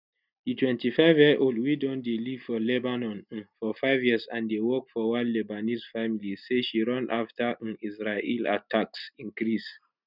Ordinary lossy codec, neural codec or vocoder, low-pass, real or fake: none; none; 5.4 kHz; real